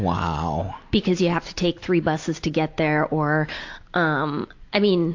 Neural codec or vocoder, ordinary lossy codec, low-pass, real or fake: none; AAC, 48 kbps; 7.2 kHz; real